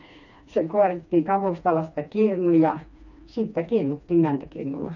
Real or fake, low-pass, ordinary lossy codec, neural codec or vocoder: fake; 7.2 kHz; none; codec, 16 kHz, 2 kbps, FreqCodec, smaller model